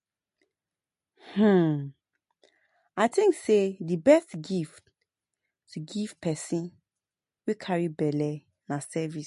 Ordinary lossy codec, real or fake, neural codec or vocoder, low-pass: MP3, 48 kbps; real; none; 14.4 kHz